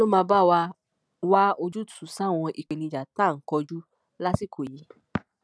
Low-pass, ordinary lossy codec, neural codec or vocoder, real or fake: none; none; none; real